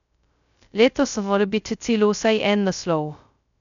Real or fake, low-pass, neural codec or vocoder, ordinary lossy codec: fake; 7.2 kHz; codec, 16 kHz, 0.2 kbps, FocalCodec; none